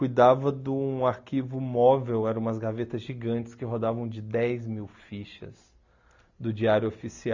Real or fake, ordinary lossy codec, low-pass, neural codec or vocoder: real; none; 7.2 kHz; none